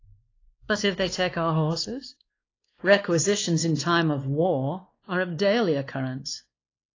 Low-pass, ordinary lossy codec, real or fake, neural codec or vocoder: 7.2 kHz; AAC, 32 kbps; fake; codec, 16 kHz, 2 kbps, X-Codec, WavLM features, trained on Multilingual LibriSpeech